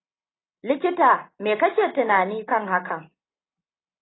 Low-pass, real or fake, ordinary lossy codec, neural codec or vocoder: 7.2 kHz; real; AAC, 16 kbps; none